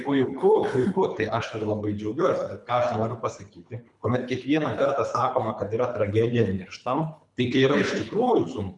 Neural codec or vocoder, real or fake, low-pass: codec, 24 kHz, 3 kbps, HILCodec; fake; 10.8 kHz